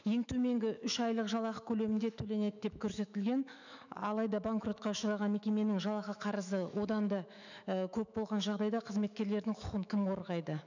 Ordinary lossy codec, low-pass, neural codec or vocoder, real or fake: none; 7.2 kHz; autoencoder, 48 kHz, 128 numbers a frame, DAC-VAE, trained on Japanese speech; fake